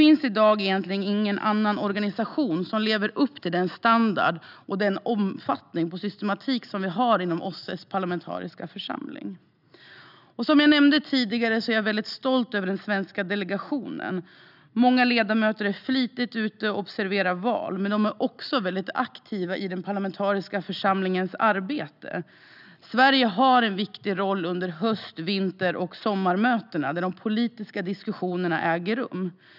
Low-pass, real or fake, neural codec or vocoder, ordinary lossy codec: 5.4 kHz; real; none; none